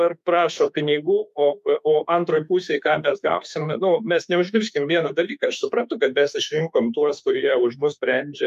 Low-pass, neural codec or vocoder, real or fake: 14.4 kHz; autoencoder, 48 kHz, 32 numbers a frame, DAC-VAE, trained on Japanese speech; fake